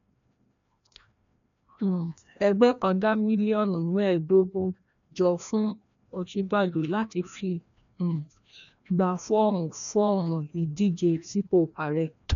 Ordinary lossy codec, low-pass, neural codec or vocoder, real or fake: MP3, 96 kbps; 7.2 kHz; codec, 16 kHz, 1 kbps, FreqCodec, larger model; fake